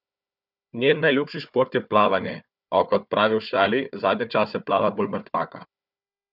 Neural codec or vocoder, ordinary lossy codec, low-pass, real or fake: codec, 16 kHz, 4 kbps, FunCodec, trained on Chinese and English, 50 frames a second; none; 5.4 kHz; fake